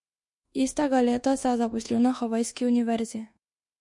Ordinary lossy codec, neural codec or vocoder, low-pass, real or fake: MP3, 48 kbps; codec, 24 kHz, 1.2 kbps, DualCodec; 10.8 kHz; fake